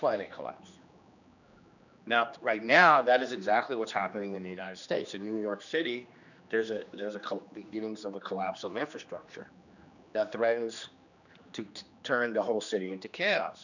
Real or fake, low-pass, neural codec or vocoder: fake; 7.2 kHz; codec, 16 kHz, 2 kbps, X-Codec, HuBERT features, trained on general audio